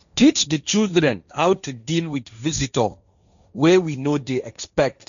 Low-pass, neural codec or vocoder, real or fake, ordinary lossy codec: 7.2 kHz; codec, 16 kHz, 1.1 kbps, Voila-Tokenizer; fake; none